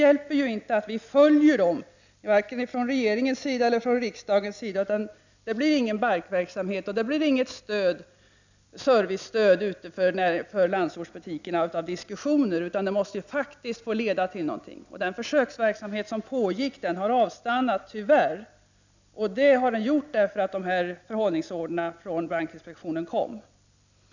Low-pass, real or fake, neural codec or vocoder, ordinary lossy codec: 7.2 kHz; real; none; none